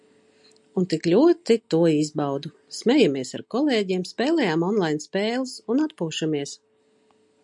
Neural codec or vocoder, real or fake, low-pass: none; real; 10.8 kHz